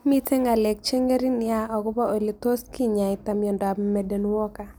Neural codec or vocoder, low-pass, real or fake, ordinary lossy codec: none; none; real; none